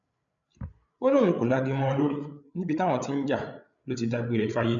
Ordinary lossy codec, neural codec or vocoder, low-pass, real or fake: MP3, 96 kbps; codec, 16 kHz, 8 kbps, FreqCodec, larger model; 7.2 kHz; fake